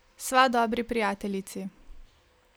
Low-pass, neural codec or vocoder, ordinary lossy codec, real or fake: none; none; none; real